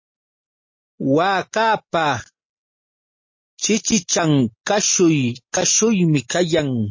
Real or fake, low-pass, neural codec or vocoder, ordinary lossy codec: real; 7.2 kHz; none; MP3, 32 kbps